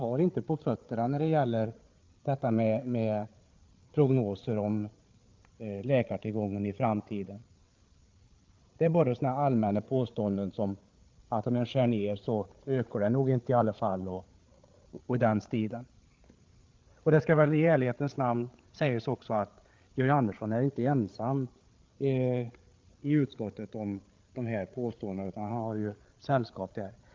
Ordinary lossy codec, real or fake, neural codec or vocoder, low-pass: Opus, 24 kbps; fake; codec, 16 kHz, 8 kbps, FreqCodec, larger model; 7.2 kHz